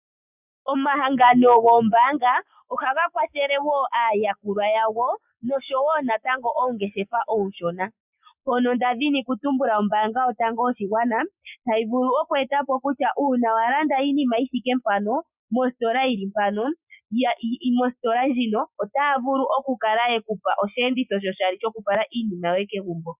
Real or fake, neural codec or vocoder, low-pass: real; none; 3.6 kHz